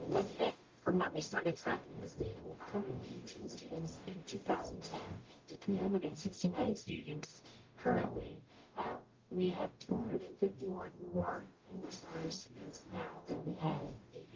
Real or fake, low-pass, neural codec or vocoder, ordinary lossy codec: fake; 7.2 kHz; codec, 44.1 kHz, 0.9 kbps, DAC; Opus, 32 kbps